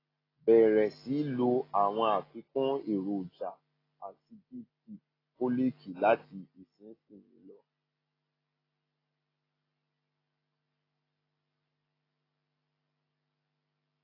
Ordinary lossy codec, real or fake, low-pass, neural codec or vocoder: AAC, 24 kbps; real; 5.4 kHz; none